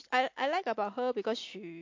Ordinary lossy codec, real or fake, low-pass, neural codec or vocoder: MP3, 48 kbps; real; 7.2 kHz; none